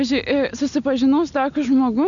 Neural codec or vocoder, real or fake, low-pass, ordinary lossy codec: none; real; 7.2 kHz; MP3, 96 kbps